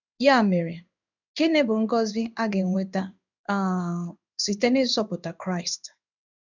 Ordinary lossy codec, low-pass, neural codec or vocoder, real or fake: none; 7.2 kHz; codec, 16 kHz in and 24 kHz out, 1 kbps, XY-Tokenizer; fake